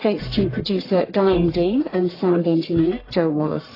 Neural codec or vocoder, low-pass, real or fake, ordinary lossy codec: codec, 44.1 kHz, 1.7 kbps, Pupu-Codec; 5.4 kHz; fake; AAC, 24 kbps